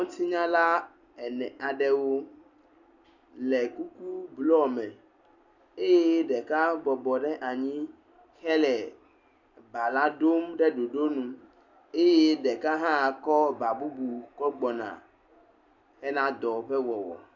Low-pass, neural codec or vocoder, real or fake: 7.2 kHz; none; real